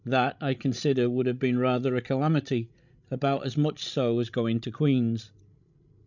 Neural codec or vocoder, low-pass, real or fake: codec, 16 kHz, 16 kbps, FreqCodec, larger model; 7.2 kHz; fake